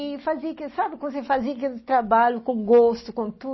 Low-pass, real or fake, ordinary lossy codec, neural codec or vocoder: 7.2 kHz; real; MP3, 24 kbps; none